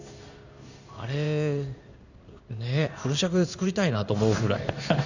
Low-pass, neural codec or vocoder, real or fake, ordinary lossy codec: 7.2 kHz; codec, 16 kHz in and 24 kHz out, 1 kbps, XY-Tokenizer; fake; none